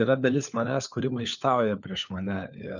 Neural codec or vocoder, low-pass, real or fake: codec, 16 kHz, 4 kbps, FunCodec, trained on LibriTTS, 50 frames a second; 7.2 kHz; fake